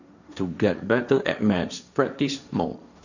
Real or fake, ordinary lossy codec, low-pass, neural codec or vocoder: fake; none; 7.2 kHz; codec, 16 kHz, 1.1 kbps, Voila-Tokenizer